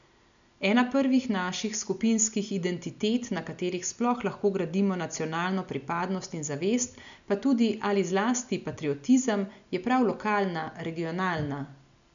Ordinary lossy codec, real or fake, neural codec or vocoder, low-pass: none; real; none; 7.2 kHz